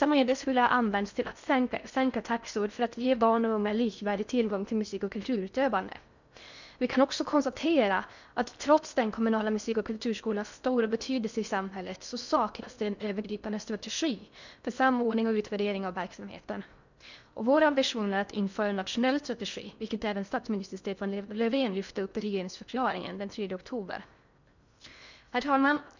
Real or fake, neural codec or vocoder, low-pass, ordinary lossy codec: fake; codec, 16 kHz in and 24 kHz out, 0.6 kbps, FocalCodec, streaming, 4096 codes; 7.2 kHz; none